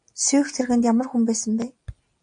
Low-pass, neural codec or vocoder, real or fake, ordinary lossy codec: 9.9 kHz; none; real; AAC, 64 kbps